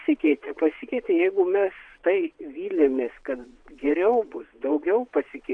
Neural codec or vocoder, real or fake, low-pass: vocoder, 22.05 kHz, 80 mel bands, WaveNeXt; fake; 9.9 kHz